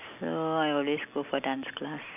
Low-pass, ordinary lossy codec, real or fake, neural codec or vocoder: 3.6 kHz; none; real; none